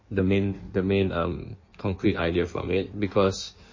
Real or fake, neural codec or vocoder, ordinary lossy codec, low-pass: fake; codec, 16 kHz in and 24 kHz out, 1.1 kbps, FireRedTTS-2 codec; MP3, 32 kbps; 7.2 kHz